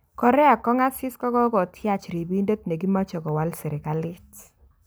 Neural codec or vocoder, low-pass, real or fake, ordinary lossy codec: none; none; real; none